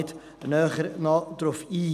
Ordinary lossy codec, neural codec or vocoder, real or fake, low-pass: none; none; real; 14.4 kHz